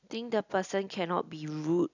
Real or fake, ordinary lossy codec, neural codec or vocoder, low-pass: real; none; none; 7.2 kHz